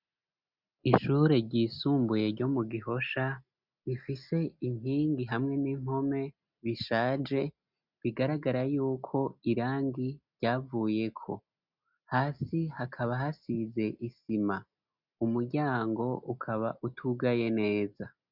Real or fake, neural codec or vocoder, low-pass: real; none; 5.4 kHz